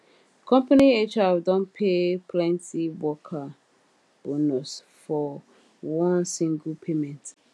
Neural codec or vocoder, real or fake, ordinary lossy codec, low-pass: none; real; none; none